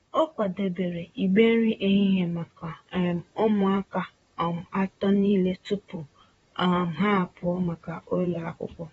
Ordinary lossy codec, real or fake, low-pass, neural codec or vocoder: AAC, 24 kbps; fake; 19.8 kHz; vocoder, 44.1 kHz, 128 mel bands, Pupu-Vocoder